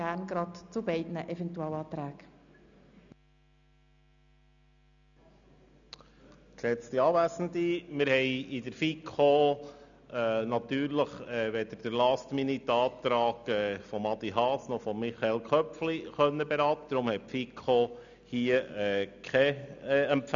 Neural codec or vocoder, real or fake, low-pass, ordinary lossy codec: none; real; 7.2 kHz; none